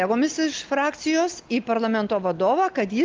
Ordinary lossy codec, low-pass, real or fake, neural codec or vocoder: Opus, 32 kbps; 7.2 kHz; real; none